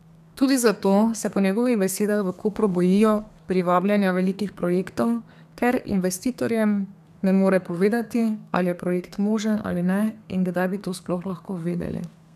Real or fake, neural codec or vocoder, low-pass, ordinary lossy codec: fake; codec, 32 kHz, 1.9 kbps, SNAC; 14.4 kHz; none